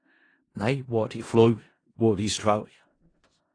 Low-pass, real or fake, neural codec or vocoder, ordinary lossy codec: 9.9 kHz; fake; codec, 16 kHz in and 24 kHz out, 0.4 kbps, LongCat-Audio-Codec, four codebook decoder; AAC, 32 kbps